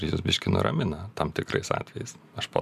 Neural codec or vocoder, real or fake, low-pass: none; real; 14.4 kHz